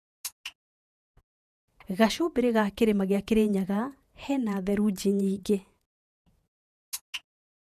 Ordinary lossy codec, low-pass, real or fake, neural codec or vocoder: none; 14.4 kHz; fake; vocoder, 48 kHz, 128 mel bands, Vocos